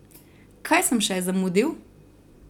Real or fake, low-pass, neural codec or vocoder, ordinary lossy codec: fake; 19.8 kHz; vocoder, 44.1 kHz, 128 mel bands every 256 samples, BigVGAN v2; none